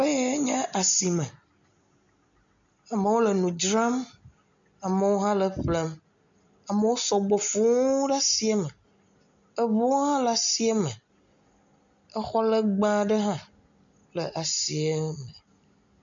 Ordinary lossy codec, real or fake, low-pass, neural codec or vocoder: MP3, 64 kbps; real; 7.2 kHz; none